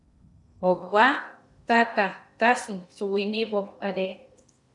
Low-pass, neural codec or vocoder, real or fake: 10.8 kHz; codec, 16 kHz in and 24 kHz out, 0.8 kbps, FocalCodec, streaming, 65536 codes; fake